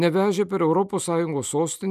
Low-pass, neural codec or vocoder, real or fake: 14.4 kHz; none; real